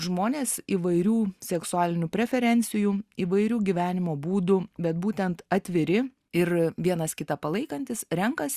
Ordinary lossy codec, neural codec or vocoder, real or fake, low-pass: Opus, 64 kbps; none; real; 14.4 kHz